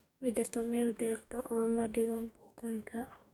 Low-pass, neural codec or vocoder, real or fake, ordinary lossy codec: 19.8 kHz; codec, 44.1 kHz, 2.6 kbps, DAC; fake; none